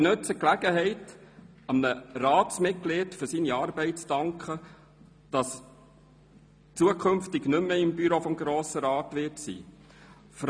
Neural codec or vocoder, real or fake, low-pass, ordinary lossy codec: none; real; 9.9 kHz; none